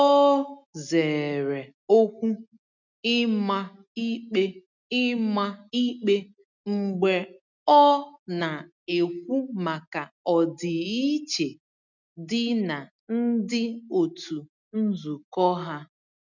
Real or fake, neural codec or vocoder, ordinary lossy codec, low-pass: real; none; none; 7.2 kHz